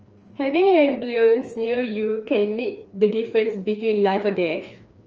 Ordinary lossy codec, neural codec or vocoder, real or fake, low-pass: Opus, 24 kbps; codec, 16 kHz, 2 kbps, FreqCodec, larger model; fake; 7.2 kHz